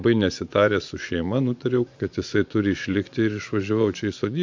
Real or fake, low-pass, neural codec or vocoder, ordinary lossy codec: fake; 7.2 kHz; vocoder, 24 kHz, 100 mel bands, Vocos; MP3, 64 kbps